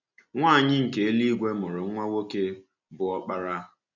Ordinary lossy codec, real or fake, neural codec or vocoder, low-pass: none; real; none; 7.2 kHz